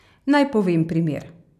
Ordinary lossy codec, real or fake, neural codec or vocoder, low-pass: none; real; none; 14.4 kHz